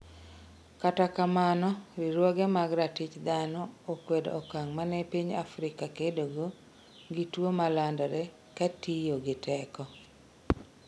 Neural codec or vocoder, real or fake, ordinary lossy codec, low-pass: none; real; none; none